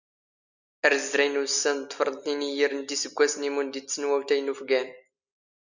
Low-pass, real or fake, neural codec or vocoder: 7.2 kHz; real; none